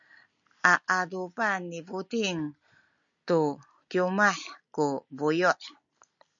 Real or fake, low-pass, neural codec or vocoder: real; 7.2 kHz; none